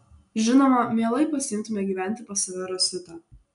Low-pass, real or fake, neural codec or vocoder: 10.8 kHz; real; none